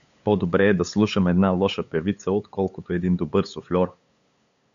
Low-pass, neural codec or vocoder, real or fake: 7.2 kHz; codec, 16 kHz, 8 kbps, FunCodec, trained on LibriTTS, 25 frames a second; fake